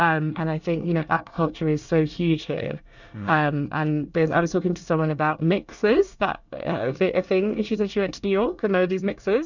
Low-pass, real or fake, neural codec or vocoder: 7.2 kHz; fake; codec, 24 kHz, 1 kbps, SNAC